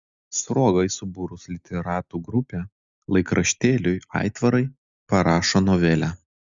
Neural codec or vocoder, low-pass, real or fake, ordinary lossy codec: none; 7.2 kHz; real; Opus, 64 kbps